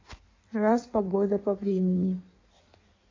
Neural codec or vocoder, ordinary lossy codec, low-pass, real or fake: codec, 16 kHz in and 24 kHz out, 1.1 kbps, FireRedTTS-2 codec; AAC, 32 kbps; 7.2 kHz; fake